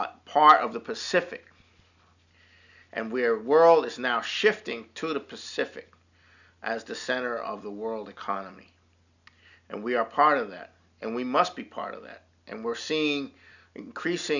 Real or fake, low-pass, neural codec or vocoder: real; 7.2 kHz; none